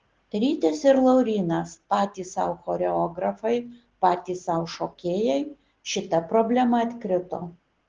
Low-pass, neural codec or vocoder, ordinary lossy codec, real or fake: 7.2 kHz; none; Opus, 16 kbps; real